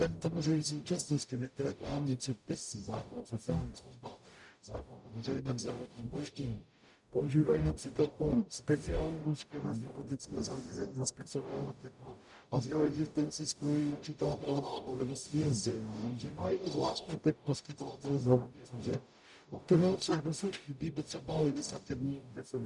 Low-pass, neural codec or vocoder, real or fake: 10.8 kHz; codec, 44.1 kHz, 0.9 kbps, DAC; fake